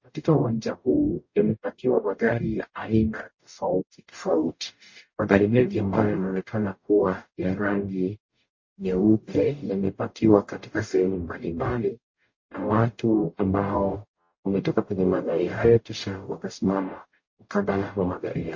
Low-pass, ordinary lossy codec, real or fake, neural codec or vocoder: 7.2 kHz; MP3, 32 kbps; fake; codec, 44.1 kHz, 0.9 kbps, DAC